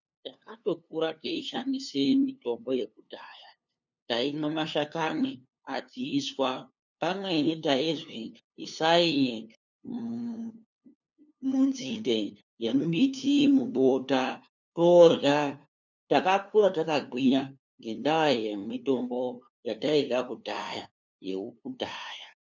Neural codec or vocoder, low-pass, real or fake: codec, 16 kHz, 2 kbps, FunCodec, trained on LibriTTS, 25 frames a second; 7.2 kHz; fake